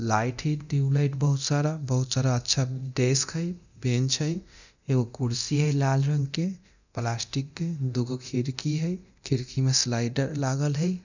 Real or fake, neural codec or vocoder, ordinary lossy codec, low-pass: fake; codec, 24 kHz, 0.9 kbps, DualCodec; none; 7.2 kHz